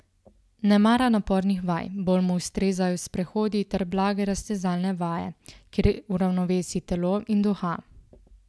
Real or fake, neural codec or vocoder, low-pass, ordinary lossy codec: real; none; none; none